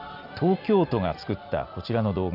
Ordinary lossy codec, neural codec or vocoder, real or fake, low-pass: none; none; real; 5.4 kHz